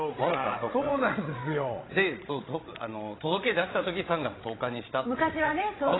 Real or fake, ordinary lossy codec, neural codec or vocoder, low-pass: fake; AAC, 16 kbps; codec, 16 kHz, 16 kbps, FreqCodec, larger model; 7.2 kHz